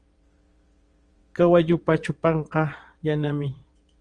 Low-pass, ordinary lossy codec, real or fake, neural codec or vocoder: 9.9 kHz; Opus, 24 kbps; fake; vocoder, 22.05 kHz, 80 mel bands, WaveNeXt